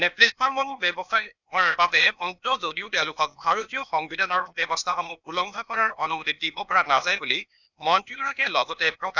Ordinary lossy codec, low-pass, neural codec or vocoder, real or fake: none; 7.2 kHz; codec, 16 kHz, 0.8 kbps, ZipCodec; fake